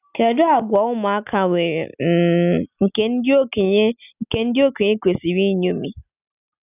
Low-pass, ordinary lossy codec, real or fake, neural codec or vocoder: 3.6 kHz; none; real; none